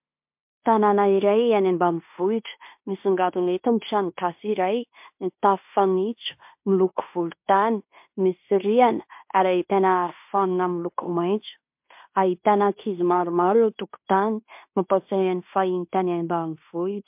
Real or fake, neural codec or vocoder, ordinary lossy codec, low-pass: fake; codec, 16 kHz in and 24 kHz out, 0.9 kbps, LongCat-Audio-Codec, fine tuned four codebook decoder; MP3, 32 kbps; 3.6 kHz